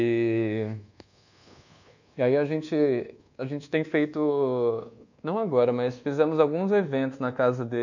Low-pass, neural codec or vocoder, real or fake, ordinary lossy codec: 7.2 kHz; codec, 24 kHz, 1.2 kbps, DualCodec; fake; none